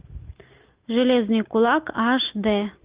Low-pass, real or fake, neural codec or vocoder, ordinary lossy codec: 3.6 kHz; real; none; Opus, 32 kbps